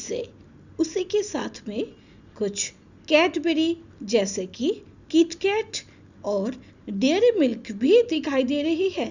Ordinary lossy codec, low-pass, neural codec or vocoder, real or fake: none; 7.2 kHz; none; real